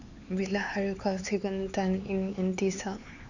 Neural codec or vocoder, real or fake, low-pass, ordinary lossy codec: codec, 16 kHz, 4 kbps, X-Codec, HuBERT features, trained on LibriSpeech; fake; 7.2 kHz; none